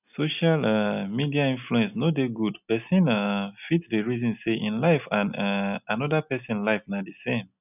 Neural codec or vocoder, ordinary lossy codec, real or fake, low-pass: none; none; real; 3.6 kHz